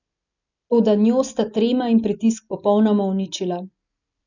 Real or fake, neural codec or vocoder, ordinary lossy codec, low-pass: real; none; none; 7.2 kHz